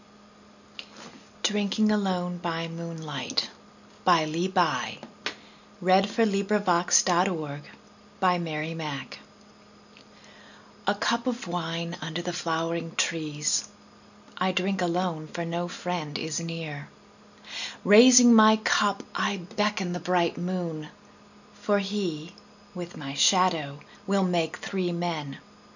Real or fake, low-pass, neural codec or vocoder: real; 7.2 kHz; none